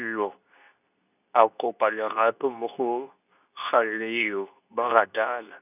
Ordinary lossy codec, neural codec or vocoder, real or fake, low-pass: none; codec, 24 kHz, 0.9 kbps, WavTokenizer, medium speech release version 2; fake; 3.6 kHz